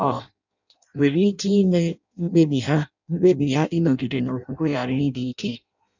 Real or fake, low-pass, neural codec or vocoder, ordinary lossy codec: fake; 7.2 kHz; codec, 16 kHz in and 24 kHz out, 0.6 kbps, FireRedTTS-2 codec; none